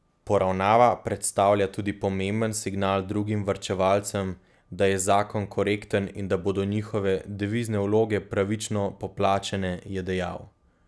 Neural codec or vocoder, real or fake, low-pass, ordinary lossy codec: none; real; none; none